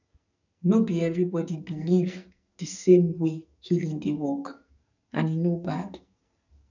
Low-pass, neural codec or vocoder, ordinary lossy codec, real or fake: 7.2 kHz; codec, 44.1 kHz, 2.6 kbps, SNAC; none; fake